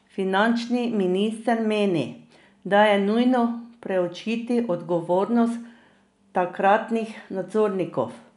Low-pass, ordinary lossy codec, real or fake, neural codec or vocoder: 10.8 kHz; none; real; none